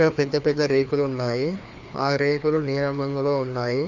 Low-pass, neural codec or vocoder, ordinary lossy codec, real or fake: 7.2 kHz; codec, 16 kHz, 2 kbps, FreqCodec, larger model; Opus, 64 kbps; fake